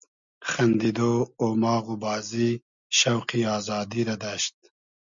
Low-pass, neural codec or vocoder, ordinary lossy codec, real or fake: 7.2 kHz; none; MP3, 64 kbps; real